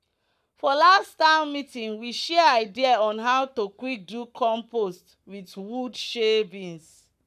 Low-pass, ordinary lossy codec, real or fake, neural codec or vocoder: 14.4 kHz; none; fake; codec, 44.1 kHz, 7.8 kbps, Pupu-Codec